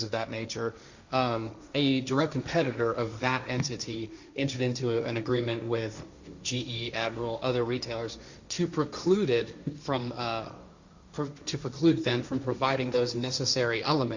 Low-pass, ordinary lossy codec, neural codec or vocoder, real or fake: 7.2 kHz; Opus, 64 kbps; codec, 16 kHz, 1.1 kbps, Voila-Tokenizer; fake